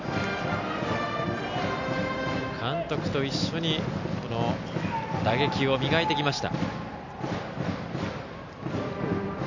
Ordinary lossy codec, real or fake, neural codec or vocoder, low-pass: none; real; none; 7.2 kHz